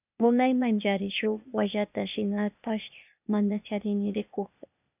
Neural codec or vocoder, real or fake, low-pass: codec, 16 kHz, 0.8 kbps, ZipCodec; fake; 3.6 kHz